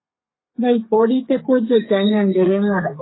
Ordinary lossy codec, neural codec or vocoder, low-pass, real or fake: AAC, 16 kbps; codec, 32 kHz, 1.9 kbps, SNAC; 7.2 kHz; fake